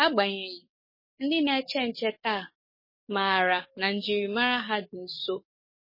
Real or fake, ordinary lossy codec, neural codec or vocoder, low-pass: fake; MP3, 24 kbps; codec, 44.1 kHz, 7.8 kbps, DAC; 5.4 kHz